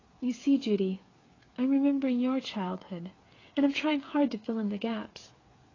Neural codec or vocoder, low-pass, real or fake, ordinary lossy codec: codec, 16 kHz, 8 kbps, FreqCodec, smaller model; 7.2 kHz; fake; AAC, 32 kbps